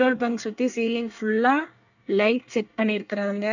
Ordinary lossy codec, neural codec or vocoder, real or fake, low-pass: none; codec, 24 kHz, 1 kbps, SNAC; fake; 7.2 kHz